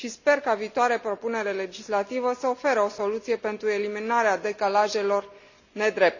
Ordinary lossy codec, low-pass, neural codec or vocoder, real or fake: none; 7.2 kHz; none; real